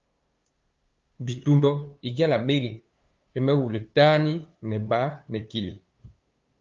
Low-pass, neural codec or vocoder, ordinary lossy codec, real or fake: 7.2 kHz; codec, 16 kHz, 2 kbps, FunCodec, trained on LibriTTS, 25 frames a second; Opus, 32 kbps; fake